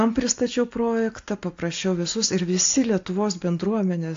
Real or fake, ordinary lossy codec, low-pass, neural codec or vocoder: real; AAC, 48 kbps; 7.2 kHz; none